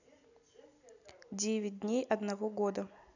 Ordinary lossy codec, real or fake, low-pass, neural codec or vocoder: none; real; 7.2 kHz; none